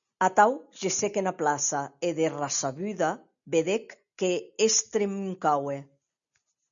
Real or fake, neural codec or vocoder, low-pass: real; none; 7.2 kHz